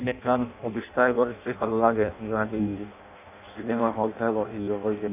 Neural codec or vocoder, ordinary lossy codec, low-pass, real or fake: codec, 16 kHz in and 24 kHz out, 0.6 kbps, FireRedTTS-2 codec; none; 3.6 kHz; fake